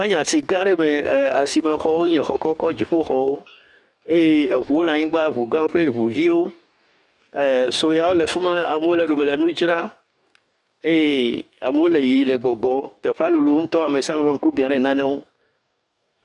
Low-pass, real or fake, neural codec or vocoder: 10.8 kHz; fake; codec, 44.1 kHz, 2.6 kbps, DAC